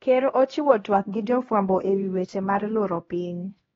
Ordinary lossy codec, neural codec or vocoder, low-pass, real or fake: AAC, 24 kbps; codec, 16 kHz, 1 kbps, X-Codec, HuBERT features, trained on LibriSpeech; 7.2 kHz; fake